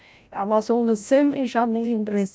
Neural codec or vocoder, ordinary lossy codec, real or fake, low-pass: codec, 16 kHz, 0.5 kbps, FreqCodec, larger model; none; fake; none